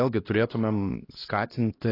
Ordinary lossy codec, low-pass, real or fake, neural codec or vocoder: AAC, 24 kbps; 5.4 kHz; fake; codec, 16 kHz, 1 kbps, X-Codec, HuBERT features, trained on LibriSpeech